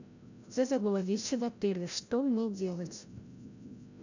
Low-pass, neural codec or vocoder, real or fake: 7.2 kHz; codec, 16 kHz, 0.5 kbps, FreqCodec, larger model; fake